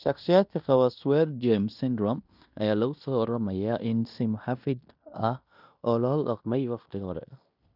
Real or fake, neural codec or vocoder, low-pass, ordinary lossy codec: fake; codec, 16 kHz in and 24 kHz out, 0.9 kbps, LongCat-Audio-Codec, fine tuned four codebook decoder; 5.4 kHz; none